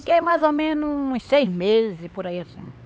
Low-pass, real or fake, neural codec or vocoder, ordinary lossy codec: none; fake; codec, 16 kHz, 4 kbps, X-Codec, HuBERT features, trained on LibriSpeech; none